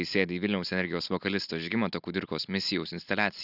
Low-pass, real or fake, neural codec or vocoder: 5.4 kHz; real; none